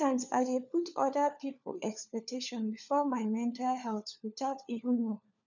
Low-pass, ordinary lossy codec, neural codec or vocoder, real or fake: 7.2 kHz; none; codec, 16 kHz, 4 kbps, FunCodec, trained on LibriTTS, 50 frames a second; fake